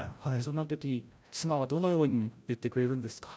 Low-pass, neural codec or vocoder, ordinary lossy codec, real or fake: none; codec, 16 kHz, 0.5 kbps, FreqCodec, larger model; none; fake